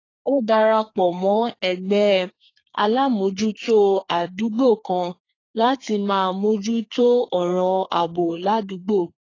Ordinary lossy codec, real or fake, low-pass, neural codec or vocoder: AAC, 32 kbps; fake; 7.2 kHz; codec, 32 kHz, 1.9 kbps, SNAC